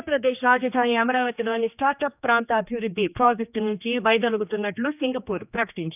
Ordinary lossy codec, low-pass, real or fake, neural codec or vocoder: none; 3.6 kHz; fake; codec, 16 kHz, 2 kbps, X-Codec, HuBERT features, trained on general audio